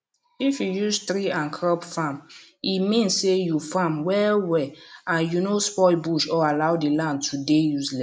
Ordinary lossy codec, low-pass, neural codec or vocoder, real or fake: none; none; none; real